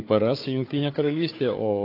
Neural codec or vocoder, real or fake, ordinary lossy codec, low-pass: codec, 16 kHz, 4 kbps, FunCodec, trained on Chinese and English, 50 frames a second; fake; AAC, 32 kbps; 5.4 kHz